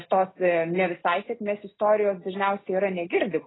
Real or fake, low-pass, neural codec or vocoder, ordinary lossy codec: real; 7.2 kHz; none; AAC, 16 kbps